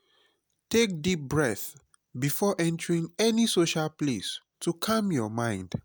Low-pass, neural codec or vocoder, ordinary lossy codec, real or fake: none; none; none; real